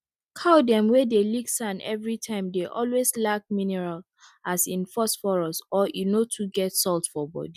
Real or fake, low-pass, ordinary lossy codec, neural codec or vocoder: real; 14.4 kHz; none; none